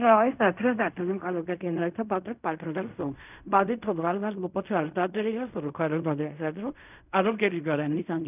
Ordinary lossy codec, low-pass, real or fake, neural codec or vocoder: none; 3.6 kHz; fake; codec, 16 kHz in and 24 kHz out, 0.4 kbps, LongCat-Audio-Codec, fine tuned four codebook decoder